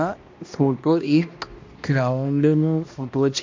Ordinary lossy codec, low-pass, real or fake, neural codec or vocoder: MP3, 48 kbps; 7.2 kHz; fake; codec, 16 kHz, 1 kbps, X-Codec, HuBERT features, trained on balanced general audio